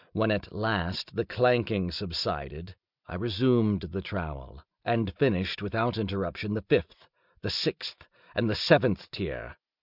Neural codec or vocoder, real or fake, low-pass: none; real; 5.4 kHz